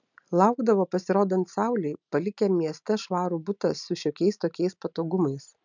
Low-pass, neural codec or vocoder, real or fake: 7.2 kHz; none; real